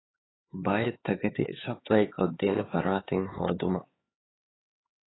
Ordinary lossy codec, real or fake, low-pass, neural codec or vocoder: AAC, 16 kbps; fake; 7.2 kHz; codec, 16 kHz, 4 kbps, X-Codec, HuBERT features, trained on LibriSpeech